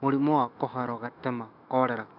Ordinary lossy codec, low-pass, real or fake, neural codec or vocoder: none; 5.4 kHz; fake; autoencoder, 48 kHz, 128 numbers a frame, DAC-VAE, trained on Japanese speech